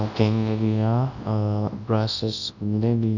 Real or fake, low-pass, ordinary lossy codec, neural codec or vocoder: fake; 7.2 kHz; Opus, 64 kbps; codec, 24 kHz, 0.9 kbps, WavTokenizer, large speech release